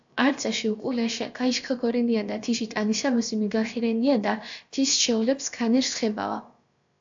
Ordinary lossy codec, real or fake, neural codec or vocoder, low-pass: AAC, 64 kbps; fake; codec, 16 kHz, about 1 kbps, DyCAST, with the encoder's durations; 7.2 kHz